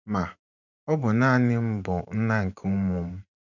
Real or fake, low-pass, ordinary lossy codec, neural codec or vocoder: fake; 7.2 kHz; none; vocoder, 44.1 kHz, 128 mel bands every 512 samples, BigVGAN v2